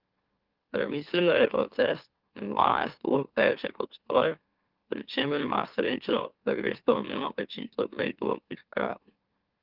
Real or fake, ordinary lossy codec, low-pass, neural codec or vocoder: fake; Opus, 32 kbps; 5.4 kHz; autoencoder, 44.1 kHz, a latent of 192 numbers a frame, MeloTTS